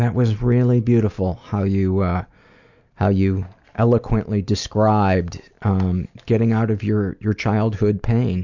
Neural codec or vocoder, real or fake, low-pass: none; real; 7.2 kHz